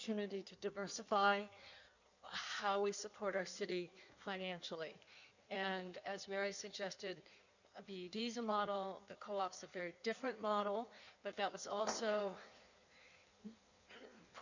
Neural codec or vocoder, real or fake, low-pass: codec, 16 kHz in and 24 kHz out, 1.1 kbps, FireRedTTS-2 codec; fake; 7.2 kHz